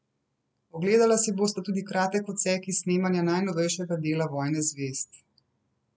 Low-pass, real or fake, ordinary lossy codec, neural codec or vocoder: none; real; none; none